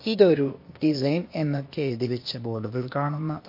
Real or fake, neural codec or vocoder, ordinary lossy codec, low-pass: fake; codec, 16 kHz, 0.8 kbps, ZipCodec; MP3, 32 kbps; 5.4 kHz